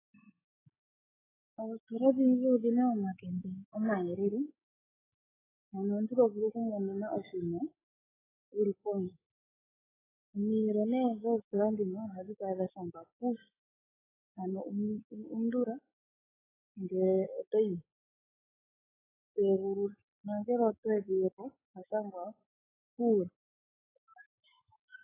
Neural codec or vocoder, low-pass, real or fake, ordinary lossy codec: autoencoder, 48 kHz, 128 numbers a frame, DAC-VAE, trained on Japanese speech; 3.6 kHz; fake; AAC, 16 kbps